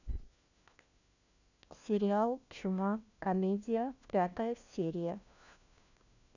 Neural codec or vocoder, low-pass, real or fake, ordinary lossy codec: codec, 16 kHz, 1 kbps, FunCodec, trained on LibriTTS, 50 frames a second; 7.2 kHz; fake; none